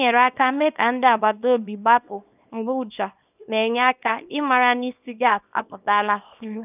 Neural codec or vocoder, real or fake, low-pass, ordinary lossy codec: codec, 24 kHz, 0.9 kbps, WavTokenizer, small release; fake; 3.6 kHz; none